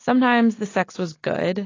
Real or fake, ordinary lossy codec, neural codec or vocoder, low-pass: real; AAC, 32 kbps; none; 7.2 kHz